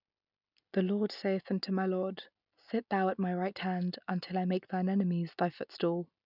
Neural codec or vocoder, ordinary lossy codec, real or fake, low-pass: none; none; real; 5.4 kHz